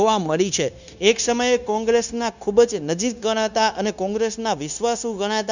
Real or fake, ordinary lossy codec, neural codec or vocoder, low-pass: fake; none; codec, 16 kHz, 0.9 kbps, LongCat-Audio-Codec; 7.2 kHz